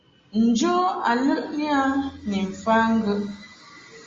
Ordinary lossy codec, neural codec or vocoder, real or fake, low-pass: Opus, 32 kbps; none; real; 7.2 kHz